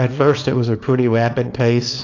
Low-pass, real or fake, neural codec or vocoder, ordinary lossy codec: 7.2 kHz; fake; codec, 24 kHz, 0.9 kbps, WavTokenizer, small release; AAC, 48 kbps